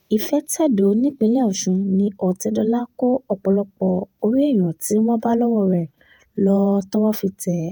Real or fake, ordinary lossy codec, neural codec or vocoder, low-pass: fake; none; vocoder, 48 kHz, 128 mel bands, Vocos; none